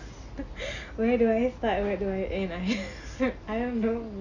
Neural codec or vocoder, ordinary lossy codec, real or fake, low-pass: none; none; real; 7.2 kHz